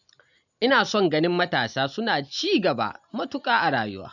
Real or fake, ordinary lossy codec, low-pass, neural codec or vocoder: real; none; 7.2 kHz; none